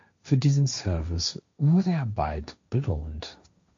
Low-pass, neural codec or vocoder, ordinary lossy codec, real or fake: 7.2 kHz; codec, 16 kHz, 1.1 kbps, Voila-Tokenizer; MP3, 48 kbps; fake